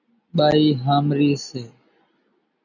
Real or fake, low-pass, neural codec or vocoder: real; 7.2 kHz; none